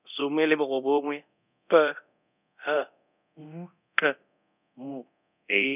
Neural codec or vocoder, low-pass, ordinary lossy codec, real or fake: codec, 24 kHz, 0.9 kbps, DualCodec; 3.6 kHz; none; fake